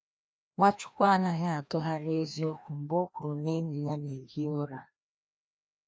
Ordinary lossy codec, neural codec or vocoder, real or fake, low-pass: none; codec, 16 kHz, 1 kbps, FreqCodec, larger model; fake; none